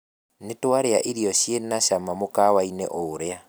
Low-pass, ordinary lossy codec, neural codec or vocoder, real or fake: none; none; none; real